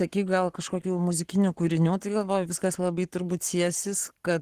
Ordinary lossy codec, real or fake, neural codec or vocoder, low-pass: Opus, 16 kbps; fake; codec, 44.1 kHz, 7.8 kbps, Pupu-Codec; 14.4 kHz